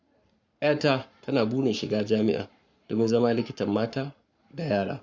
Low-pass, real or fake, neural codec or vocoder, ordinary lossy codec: 7.2 kHz; fake; codec, 44.1 kHz, 7.8 kbps, Pupu-Codec; Opus, 64 kbps